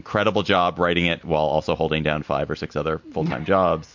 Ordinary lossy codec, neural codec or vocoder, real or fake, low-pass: MP3, 48 kbps; none; real; 7.2 kHz